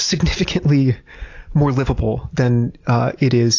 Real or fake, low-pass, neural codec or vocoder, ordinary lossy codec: real; 7.2 kHz; none; AAC, 48 kbps